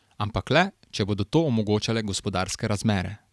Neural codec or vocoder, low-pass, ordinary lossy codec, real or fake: none; none; none; real